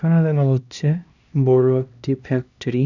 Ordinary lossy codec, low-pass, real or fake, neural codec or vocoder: none; 7.2 kHz; fake; codec, 16 kHz, 1 kbps, X-Codec, HuBERT features, trained on LibriSpeech